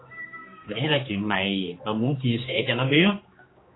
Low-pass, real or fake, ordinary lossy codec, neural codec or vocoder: 7.2 kHz; fake; AAC, 16 kbps; codec, 16 kHz, 2 kbps, X-Codec, HuBERT features, trained on general audio